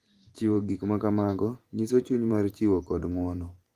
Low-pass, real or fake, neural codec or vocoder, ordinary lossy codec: 14.4 kHz; fake; vocoder, 44.1 kHz, 128 mel bands every 512 samples, BigVGAN v2; Opus, 24 kbps